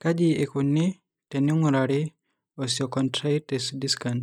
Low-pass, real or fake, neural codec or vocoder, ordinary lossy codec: 19.8 kHz; real; none; none